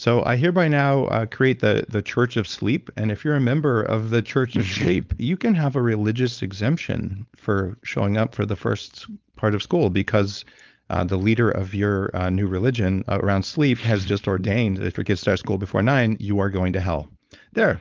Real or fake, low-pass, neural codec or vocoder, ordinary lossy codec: fake; 7.2 kHz; codec, 16 kHz, 4.8 kbps, FACodec; Opus, 24 kbps